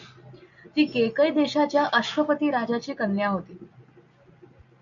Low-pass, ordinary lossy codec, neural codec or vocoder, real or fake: 7.2 kHz; MP3, 96 kbps; none; real